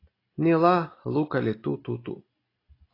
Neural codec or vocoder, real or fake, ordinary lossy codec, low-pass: none; real; AAC, 24 kbps; 5.4 kHz